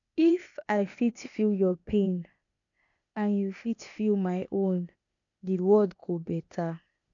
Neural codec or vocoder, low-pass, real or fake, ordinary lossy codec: codec, 16 kHz, 0.8 kbps, ZipCodec; 7.2 kHz; fake; none